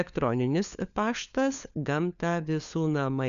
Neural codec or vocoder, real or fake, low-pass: codec, 16 kHz, 4 kbps, FunCodec, trained on LibriTTS, 50 frames a second; fake; 7.2 kHz